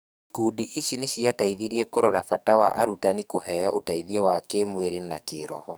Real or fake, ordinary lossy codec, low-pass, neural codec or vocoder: fake; none; none; codec, 44.1 kHz, 2.6 kbps, SNAC